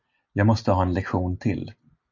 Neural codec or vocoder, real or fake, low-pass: none; real; 7.2 kHz